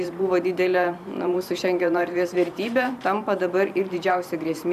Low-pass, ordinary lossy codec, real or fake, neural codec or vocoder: 14.4 kHz; AAC, 96 kbps; fake; vocoder, 44.1 kHz, 128 mel bands every 512 samples, BigVGAN v2